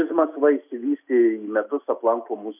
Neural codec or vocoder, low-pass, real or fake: none; 3.6 kHz; real